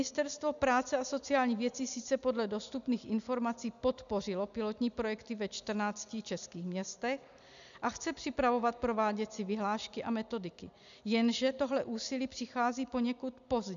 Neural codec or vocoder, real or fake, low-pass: none; real; 7.2 kHz